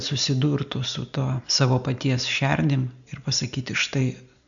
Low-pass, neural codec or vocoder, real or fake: 7.2 kHz; none; real